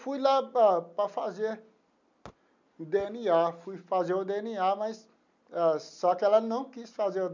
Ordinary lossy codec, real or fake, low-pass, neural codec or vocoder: none; real; 7.2 kHz; none